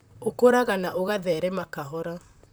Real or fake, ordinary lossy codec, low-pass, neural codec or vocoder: fake; none; none; vocoder, 44.1 kHz, 128 mel bands, Pupu-Vocoder